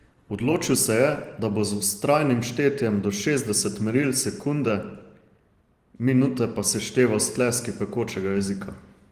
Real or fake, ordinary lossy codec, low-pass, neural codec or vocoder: fake; Opus, 24 kbps; 14.4 kHz; vocoder, 44.1 kHz, 128 mel bands every 512 samples, BigVGAN v2